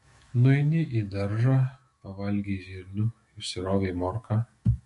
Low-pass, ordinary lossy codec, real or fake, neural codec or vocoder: 14.4 kHz; MP3, 48 kbps; fake; autoencoder, 48 kHz, 128 numbers a frame, DAC-VAE, trained on Japanese speech